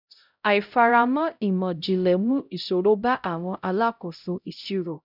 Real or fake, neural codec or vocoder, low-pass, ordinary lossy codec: fake; codec, 16 kHz, 0.5 kbps, X-Codec, HuBERT features, trained on LibriSpeech; 5.4 kHz; none